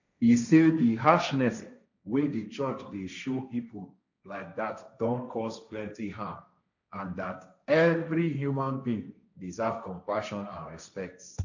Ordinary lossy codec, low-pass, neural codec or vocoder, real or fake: none; 7.2 kHz; codec, 16 kHz, 1.1 kbps, Voila-Tokenizer; fake